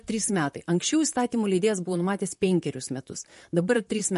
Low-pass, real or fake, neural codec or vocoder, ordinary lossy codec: 14.4 kHz; fake; vocoder, 44.1 kHz, 128 mel bands, Pupu-Vocoder; MP3, 48 kbps